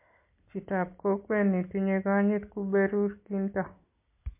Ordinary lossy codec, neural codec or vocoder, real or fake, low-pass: MP3, 24 kbps; none; real; 3.6 kHz